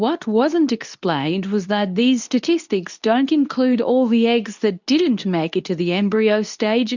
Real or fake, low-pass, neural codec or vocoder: fake; 7.2 kHz; codec, 24 kHz, 0.9 kbps, WavTokenizer, medium speech release version 2